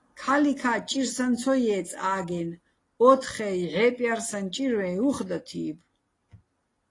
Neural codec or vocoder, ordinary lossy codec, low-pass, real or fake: none; AAC, 32 kbps; 10.8 kHz; real